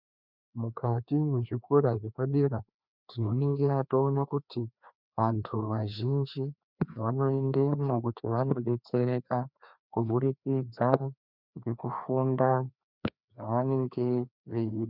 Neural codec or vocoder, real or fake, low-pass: codec, 16 kHz, 2 kbps, FreqCodec, larger model; fake; 5.4 kHz